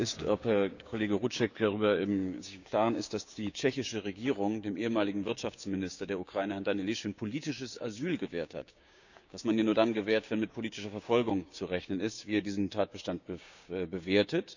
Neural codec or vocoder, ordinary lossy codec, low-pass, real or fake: codec, 16 kHz, 6 kbps, DAC; none; 7.2 kHz; fake